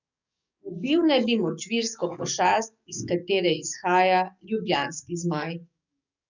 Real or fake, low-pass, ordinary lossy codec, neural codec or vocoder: fake; 7.2 kHz; none; codec, 44.1 kHz, 7.8 kbps, DAC